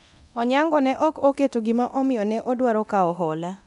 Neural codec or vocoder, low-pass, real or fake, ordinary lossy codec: codec, 24 kHz, 0.9 kbps, DualCodec; 10.8 kHz; fake; none